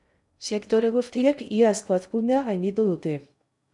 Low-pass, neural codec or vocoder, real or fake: 10.8 kHz; codec, 16 kHz in and 24 kHz out, 0.6 kbps, FocalCodec, streaming, 2048 codes; fake